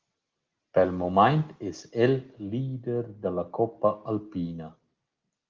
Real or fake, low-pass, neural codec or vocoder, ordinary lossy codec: real; 7.2 kHz; none; Opus, 32 kbps